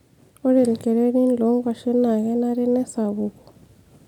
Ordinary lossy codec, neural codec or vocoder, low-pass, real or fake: none; none; 19.8 kHz; real